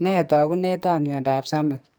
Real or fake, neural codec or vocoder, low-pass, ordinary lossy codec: fake; codec, 44.1 kHz, 2.6 kbps, SNAC; none; none